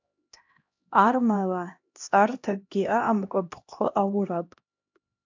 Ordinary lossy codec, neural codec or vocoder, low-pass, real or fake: AAC, 48 kbps; codec, 16 kHz, 1 kbps, X-Codec, HuBERT features, trained on LibriSpeech; 7.2 kHz; fake